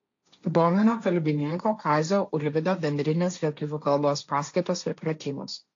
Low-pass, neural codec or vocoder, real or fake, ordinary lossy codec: 7.2 kHz; codec, 16 kHz, 1.1 kbps, Voila-Tokenizer; fake; AAC, 48 kbps